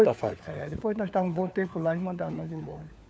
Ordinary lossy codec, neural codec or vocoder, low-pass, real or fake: none; codec, 16 kHz, 4 kbps, FunCodec, trained on LibriTTS, 50 frames a second; none; fake